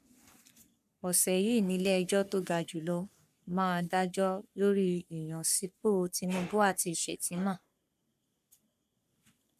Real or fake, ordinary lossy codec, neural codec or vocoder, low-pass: fake; AAC, 96 kbps; codec, 44.1 kHz, 3.4 kbps, Pupu-Codec; 14.4 kHz